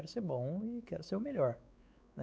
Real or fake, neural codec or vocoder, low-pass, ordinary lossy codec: fake; codec, 16 kHz, 4 kbps, X-Codec, WavLM features, trained on Multilingual LibriSpeech; none; none